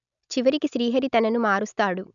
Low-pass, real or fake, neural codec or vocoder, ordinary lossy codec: 7.2 kHz; real; none; none